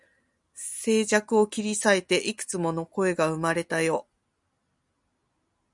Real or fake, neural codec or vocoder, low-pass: real; none; 10.8 kHz